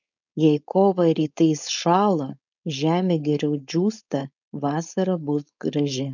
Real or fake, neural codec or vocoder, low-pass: fake; codec, 16 kHz, 4.8 kbps, FACodec; 7.2 kHz